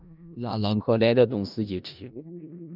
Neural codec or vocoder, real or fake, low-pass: codec, 16 kHz in and 24 kHz out, 0.4 kbps, LongCat-Audio-Codec, four codebook decoder; fake; 5.4 kHz